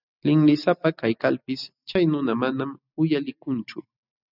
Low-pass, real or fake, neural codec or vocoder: 5.4 kHz; real; none